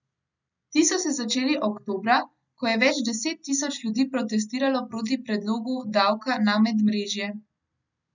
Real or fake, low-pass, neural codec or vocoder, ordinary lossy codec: real; 7.2 kHz; none; none